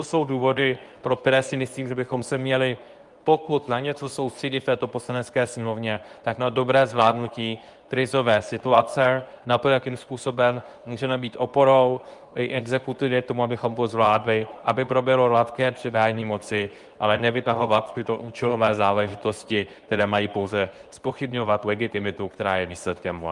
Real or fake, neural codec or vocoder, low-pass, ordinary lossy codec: fake; codec, 24 kHz, 0.9 kbps, WavTokenizer, medium speech release version 2; 10.8 kHz; Opus, 32 kbps